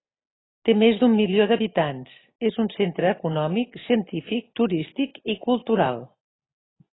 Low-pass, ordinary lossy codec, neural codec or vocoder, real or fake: 7.2 kHz; AAC, 16 kbps; none; real